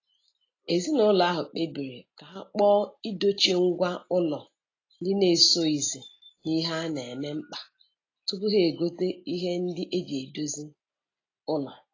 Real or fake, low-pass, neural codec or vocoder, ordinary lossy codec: real; 7.2 kHz; none; AAC, 32 kbps